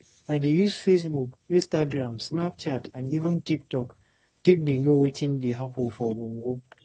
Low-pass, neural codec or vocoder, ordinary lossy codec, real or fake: 10.8 kHz; codec, 24 kHz, 0.9 kbps, WavTokenizer, medium music audio release; AAC, 32 kbps; fake